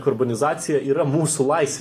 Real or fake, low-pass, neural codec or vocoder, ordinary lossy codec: real; 14.4 kHz; none; AAC, 48 kbps